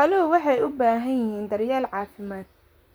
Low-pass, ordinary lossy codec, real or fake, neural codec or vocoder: none; none; fake; vocoder, 44.1 kHz, 128 mel bands, Pupu-Vocoder